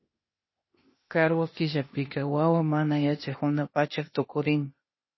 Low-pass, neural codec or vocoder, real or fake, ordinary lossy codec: 7.2 kHz; codec, 16 kHz, 0.8 kbps, ZipCodec; fake; MP3, 24 kbps